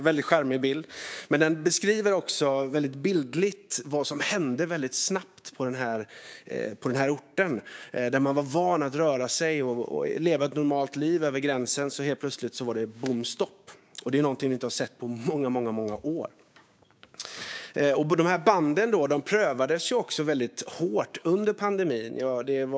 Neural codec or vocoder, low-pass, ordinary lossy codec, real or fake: codec, 16 kHz, 6 kbps, DAC; none; none; fake